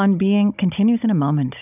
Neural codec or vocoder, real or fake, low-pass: codec, 16 kHz, 16 kbps, FunCodec, trained on Chinese and English, 50 frames a second; fake; 3.6 kHz